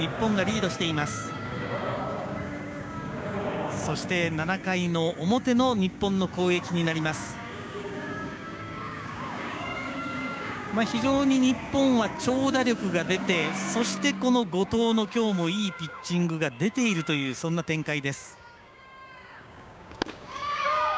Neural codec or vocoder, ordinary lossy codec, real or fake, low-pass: codec, 16 kHz, 6 kbps, DAC; none; fake; none